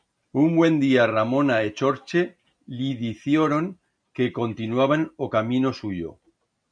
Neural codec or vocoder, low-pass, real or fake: none; 9.9 kHz; real